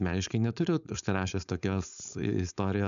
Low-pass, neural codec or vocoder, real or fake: 7.2 kHz; codec, 16 kHz, 4.8 kbps, FACodec; fake